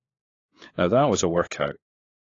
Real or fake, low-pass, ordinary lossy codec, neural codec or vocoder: fake; 7.2 kHz; AAC, 32 kbps; codec, 16 kHz, 4 kbps, FunCodec, trained on LibriTTS, 50 frames a second